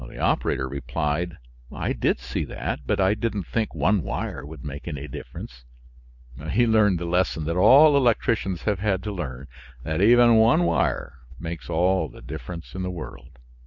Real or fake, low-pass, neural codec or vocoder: real; 7.2 kHz; none